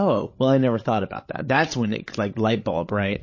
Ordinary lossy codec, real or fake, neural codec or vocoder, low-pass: MP3, 32 kbps; fake; codec, 16 kHz, 8 kbps, FreqCodec, larger model; 7.2 kHz